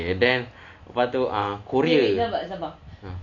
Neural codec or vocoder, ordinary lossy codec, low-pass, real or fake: none; none; 7.2 kHz; real